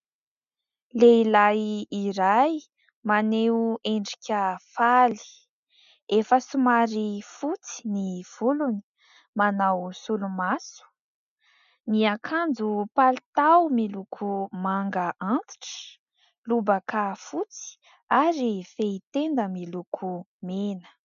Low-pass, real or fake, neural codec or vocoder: 7.2 kHz; real; none